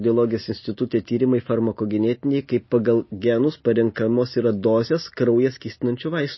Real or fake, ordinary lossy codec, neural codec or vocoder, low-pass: real; MP3, 24 kbps; none; 7.2 kHz